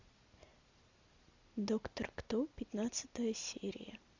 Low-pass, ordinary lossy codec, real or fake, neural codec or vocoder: 7.2 kHz; MP3, 64 kbps; real; none